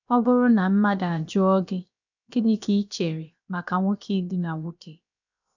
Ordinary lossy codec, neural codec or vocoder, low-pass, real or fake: none; codec, 16 kHz, about 1 kbps, DyCAST, with the encoder's durations; 7.2 kHz; fake